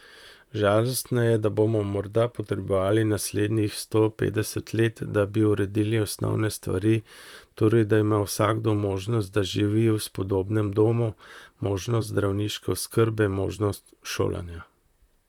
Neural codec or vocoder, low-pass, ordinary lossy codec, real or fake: vocoder, 44.1 kHz, 128 mel bands, Pupu-Vocoder; 19.8 kHz; none; fake